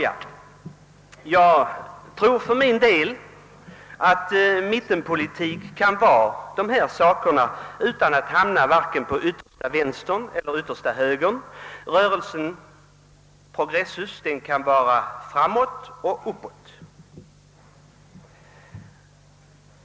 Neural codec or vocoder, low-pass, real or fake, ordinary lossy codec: none; none; real; none